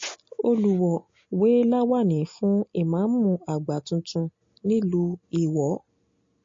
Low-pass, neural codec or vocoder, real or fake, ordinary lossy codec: 7.2 kHz; none; real; MP3, 32 kbps